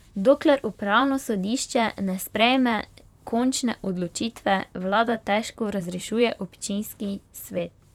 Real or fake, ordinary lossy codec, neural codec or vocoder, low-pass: fake; none; vocoder, 44.1 kHz, 128 mel bands, Pupu-Vocoder; 19.8 kHz